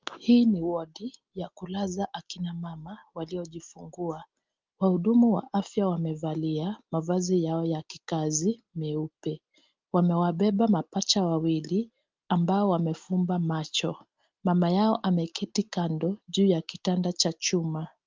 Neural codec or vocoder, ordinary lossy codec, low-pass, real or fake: none; Opus, 32 kbps; 7.2 kHz; real